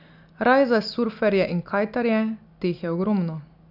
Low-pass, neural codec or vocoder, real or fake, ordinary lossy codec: 5.4 kHz; none; real; none